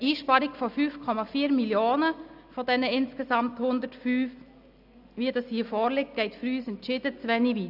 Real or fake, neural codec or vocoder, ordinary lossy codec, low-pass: real; none; AAC, 48 kbps; 5.4 kHz